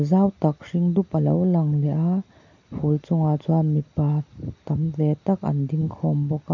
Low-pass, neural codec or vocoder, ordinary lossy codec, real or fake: 7.2 kHz; none; MP3, 48 kbps; real